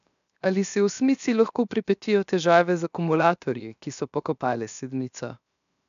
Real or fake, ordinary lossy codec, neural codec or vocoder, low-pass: fake; none; codec, 16 kHz, 0.7 kbps, FocalCodec; 7.2 kHz